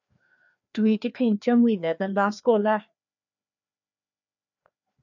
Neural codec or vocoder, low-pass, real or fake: codec, 16 kHz, 2 kbps, FreqCodec, larger model; 7.2 kHz; fake